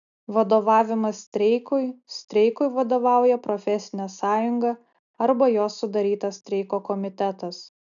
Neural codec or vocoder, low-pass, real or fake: none; 7.2 kHz; real